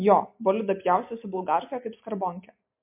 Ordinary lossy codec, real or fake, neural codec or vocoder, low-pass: MP3, 32 kbps; real; none; 3.6 kHz